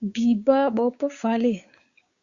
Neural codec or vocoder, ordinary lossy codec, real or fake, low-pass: codec, 16 kHz, 6 kbps, DAC; Opus, 64 kbps; fake; 7.2 kHz